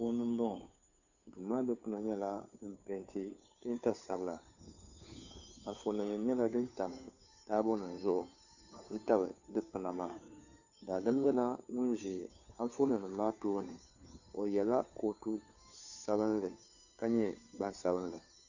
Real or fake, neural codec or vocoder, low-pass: fake; codec, 16 kHz, 2 kbps, FunCodec, trained on Chinese and English, 25 frames a second; 7.2 kHz